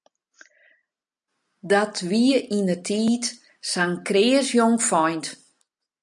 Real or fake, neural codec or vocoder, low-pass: real; none; 10.8 kHz